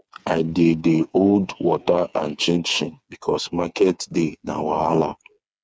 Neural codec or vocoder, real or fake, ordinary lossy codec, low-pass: codec, 16 kHz, 4 kbps, FreqCodec, smaller model; fake; none; none